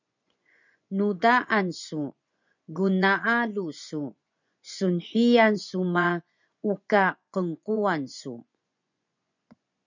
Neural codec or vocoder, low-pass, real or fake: vocoder, 24 kHz, 100 mel bands, Vocos; 7.2 kHz; fake